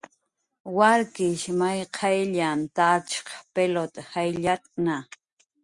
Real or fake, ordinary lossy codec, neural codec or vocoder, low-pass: real; Opus, 64 kbps; none; 10.8 kHz